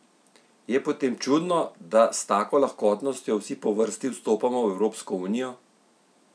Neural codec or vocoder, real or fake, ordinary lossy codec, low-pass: none; real; none; none